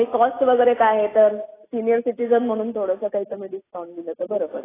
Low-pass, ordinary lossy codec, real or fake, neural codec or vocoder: 3.6 kHz; AAC, 16 kbps; real; none